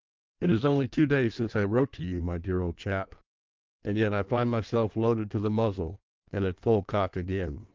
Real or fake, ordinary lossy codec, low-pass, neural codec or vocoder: fake; Opus, 24 kbps; 7.2 kHz; codec, 16 kHz in and 24 kHz out, 1.1 kbps, FireRedTTS-2 codec